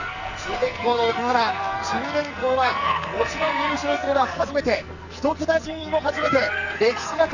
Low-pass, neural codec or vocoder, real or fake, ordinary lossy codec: 7.2 kHz; codec, 44.1 kHz, 2.6 kbps, SNAC; fake; none